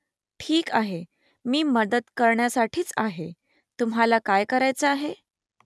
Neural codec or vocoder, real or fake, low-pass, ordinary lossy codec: none; real; none; none